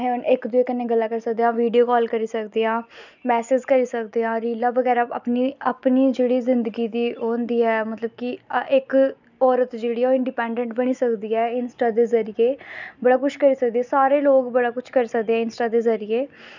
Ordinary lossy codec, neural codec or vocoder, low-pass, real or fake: none; none; 7.2 kHz; real